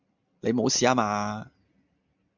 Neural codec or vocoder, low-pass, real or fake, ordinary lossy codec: none; 7.2 kHz; real; MP3, 64 kbps